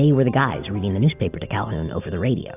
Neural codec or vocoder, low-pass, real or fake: none; 3.6 kHz; real